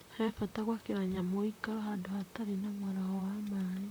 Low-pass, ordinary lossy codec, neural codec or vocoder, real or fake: none; none; vocoder, 44.1 kHz, 128 mel bands, Pupu-Vocoder; fake